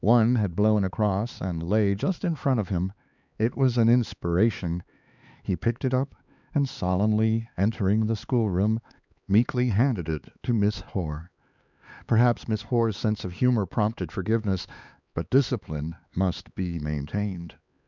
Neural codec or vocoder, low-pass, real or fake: codec, 16 kHz, 4 kbps, X-Codec, HuBERT features, trained on LibriSpeech; 7.2 kHz; fake